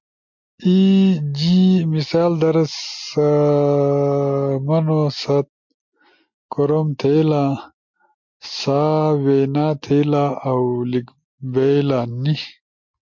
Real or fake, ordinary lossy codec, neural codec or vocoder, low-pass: real; MP3, 48 kbps; none; 7.2 kHz